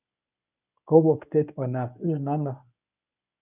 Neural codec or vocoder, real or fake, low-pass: codec, 24 kHz, 0.9 kbps, WavTokenizer, medium speech release version 1; fake; 3.6 kHz